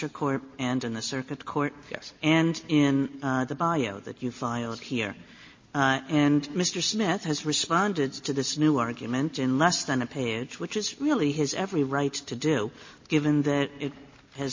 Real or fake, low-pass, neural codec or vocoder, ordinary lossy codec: real; 7.2 kHz; none; MP3, 32 kbps